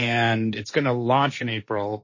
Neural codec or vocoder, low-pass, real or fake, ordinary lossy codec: codec, 16 kHz, 1.1 kbps, Voila-Tokenizer; 7.2 kHz; fake; MP3, 32 kbps